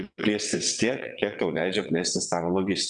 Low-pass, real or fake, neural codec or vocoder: 9.9 kHz; fake; vocoder, 22.05 kHz, 80 mel bands, WaveNeXt